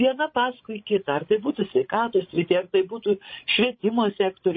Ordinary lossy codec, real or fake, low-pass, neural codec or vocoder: MP3, 24 kbps; fake; 7.2 kHz; codec, 16 kHz, 16 kbps, FreqCodec, larger model